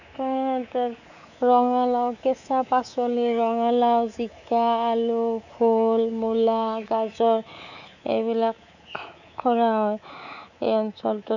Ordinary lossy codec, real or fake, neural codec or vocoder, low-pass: none; fake; codec, 24 kHz, 3.1 kbps, DualCodec; 7.2 kHz